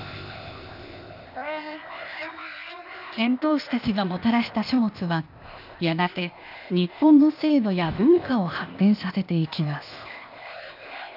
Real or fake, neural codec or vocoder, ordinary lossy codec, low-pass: fake; codec, 16 kHz, 0.8 kbps, ZipCodec; none; 5.4 kHz